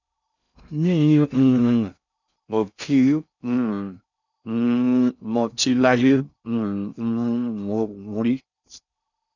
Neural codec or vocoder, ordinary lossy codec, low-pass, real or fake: codec, 16 kHz in and 24 kHz out, 0.6 kbps, FocalCodec, streaming, 2048 codes; none; 7.2 kHz; fake